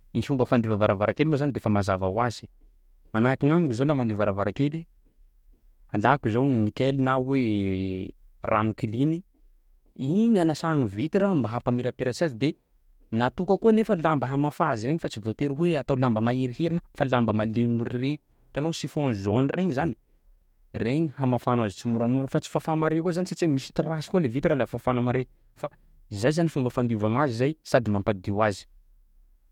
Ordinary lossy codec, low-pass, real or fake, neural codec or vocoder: MP3, 96 kbps; 19.8 kHz; fake; codec, 44.1 kHz, 2.6 kbps, DAC